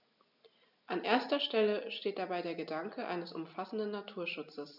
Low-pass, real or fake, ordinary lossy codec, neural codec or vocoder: 5.4 kHz; real; none; none